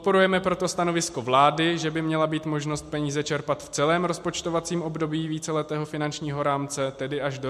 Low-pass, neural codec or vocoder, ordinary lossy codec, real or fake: 14.4 kHz; none; MP3, 64 kbps; real